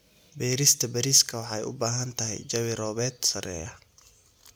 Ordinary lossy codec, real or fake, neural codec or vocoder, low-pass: none; real; none; none